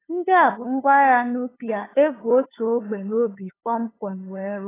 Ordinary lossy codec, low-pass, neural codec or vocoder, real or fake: AAC, 16 kbps; 3.6 kHz; codec, 16 kHz, 2 kbps, FunCodec, trained on LibriTTS, 25 frames a second; fake